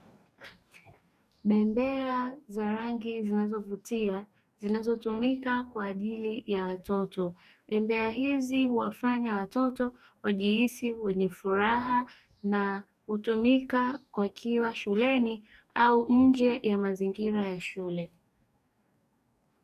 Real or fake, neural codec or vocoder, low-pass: fake; codec, 44.1 kHz, 2.6 kbps, DAC; 14.4 kHz